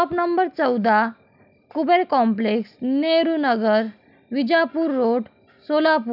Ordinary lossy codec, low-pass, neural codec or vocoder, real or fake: none; 5.4 kHz; none; real